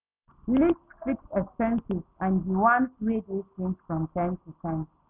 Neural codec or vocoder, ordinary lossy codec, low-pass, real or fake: none; none; 3.6 kHz; real